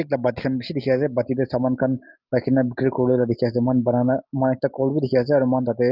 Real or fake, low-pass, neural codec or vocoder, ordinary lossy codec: real; 5.4 kHz; none; Opus, 32 kbps